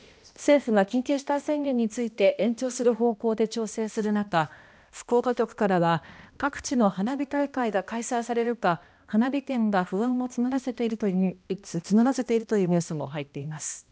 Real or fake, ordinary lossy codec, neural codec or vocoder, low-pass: fake; none; codec, 16 kHz, 1 kbps, X-Codec, HuBERT features, trained on balanced general audio; none